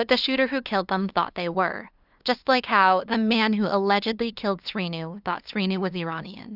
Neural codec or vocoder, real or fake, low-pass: codec, 16 kHz, 2 kbps, FunCodec, trained on LibriTTS, 25 frames a second; fake; 5.4 kHz